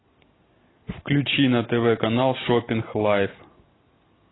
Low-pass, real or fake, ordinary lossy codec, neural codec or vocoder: 7.2 kHz; real; AAC, 16 kbps; none